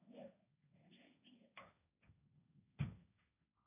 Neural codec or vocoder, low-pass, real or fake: codec, 16 kHz, 1.1 kbps, Voila-Tokenizer; 3.6 kHz; fake